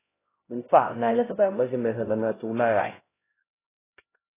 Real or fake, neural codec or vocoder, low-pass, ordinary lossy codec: fake; codec, 16 kHz, 0.5 kbps, X-Codec, HuBERT features, trained on LibriSpeech; 3.6 kHz; AAC, 16 kbps